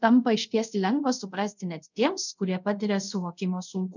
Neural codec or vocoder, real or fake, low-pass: codec, 24 kHz, 0.5 kbps, DualCodec; fake; 7.2 kHz